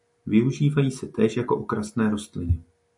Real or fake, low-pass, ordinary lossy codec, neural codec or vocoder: real; 10.8 kHz; MP3, 96 kbps; none